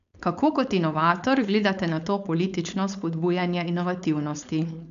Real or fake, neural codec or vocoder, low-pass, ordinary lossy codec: fake; codec, 16 kHz, 4.8 kbps, FACodec; 7.2 kHz; MP3, 96 kbps